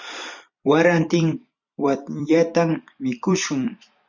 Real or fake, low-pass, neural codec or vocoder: fake; 7.2 kHz; vocoder, 44.1 kHz, 128 mel bands every 256 samples, BigVGAN v2